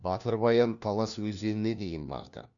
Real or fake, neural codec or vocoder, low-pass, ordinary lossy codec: fake; codec, 16 kHz, 1 kbps, FunCodec, trained on LibriTTS, 50 frames a second; 7.2 kHz; none